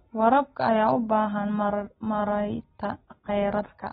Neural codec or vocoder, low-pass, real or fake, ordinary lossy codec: none; 19.8 kHz; real; AAC, 16 kbps